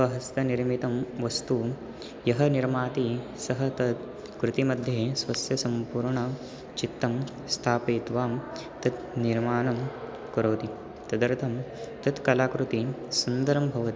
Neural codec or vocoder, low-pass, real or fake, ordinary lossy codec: none; none; real; none